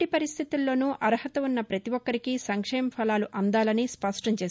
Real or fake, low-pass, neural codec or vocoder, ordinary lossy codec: real; none; none; none